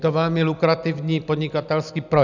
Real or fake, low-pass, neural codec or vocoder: real; 7.2 kHz; none